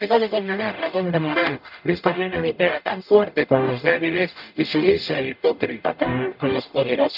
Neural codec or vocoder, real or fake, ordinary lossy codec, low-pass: codec, 44.1 kHz, 0.9 kbps, DAC; fake; none; 5.4 kHz